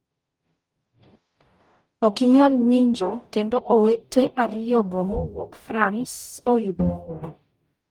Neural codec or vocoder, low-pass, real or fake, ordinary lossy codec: codec, 44.1 kHz, 0.9 kbps, DAC; 19.8 kHz; fake; Opus, 32 kbps